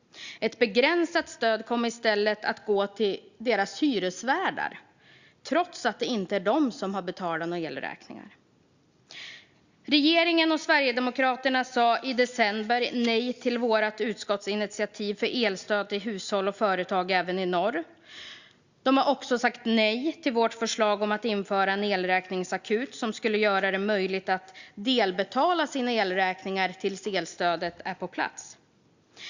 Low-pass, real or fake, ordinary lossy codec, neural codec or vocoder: 7.2 kHz; real; Opus, 64 kbps; none